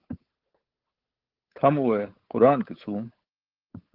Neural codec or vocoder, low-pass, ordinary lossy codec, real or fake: codec, 16 kHz, 8 kbps, FunCodec, trained on Chinese and English, 25 frames a second; 5.4 kHz; Opus, 16 kbps; fake